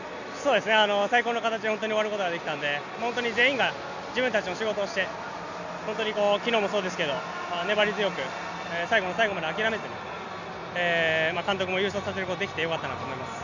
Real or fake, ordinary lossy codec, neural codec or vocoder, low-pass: real; none; none; 7.2 kHz